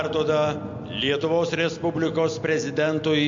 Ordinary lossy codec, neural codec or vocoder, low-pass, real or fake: MP3, 48 kbps; none; 7.2 kHz; real